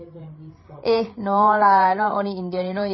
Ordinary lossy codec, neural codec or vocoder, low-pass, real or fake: MP3, 24 kbps; codec, 16 kHz, 8 kbps, FreqCodec, larger model; 7.2 kHz; fake